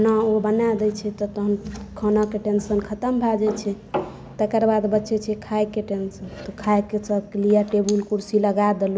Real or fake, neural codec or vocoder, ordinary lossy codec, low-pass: real; none; none; none